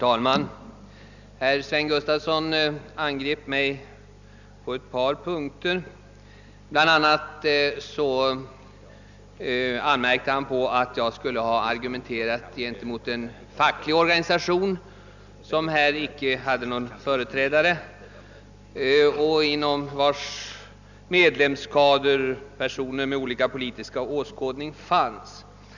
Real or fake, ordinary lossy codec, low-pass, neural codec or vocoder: real; none; 7.2 kHz; none